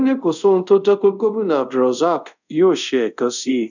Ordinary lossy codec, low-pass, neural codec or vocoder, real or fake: none; 7.2 kHz; codec, 24 kHz, 0.5 kbps, DualCodec; fake